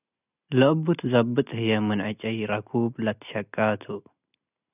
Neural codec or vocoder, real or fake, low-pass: none; real; 3.6 kHz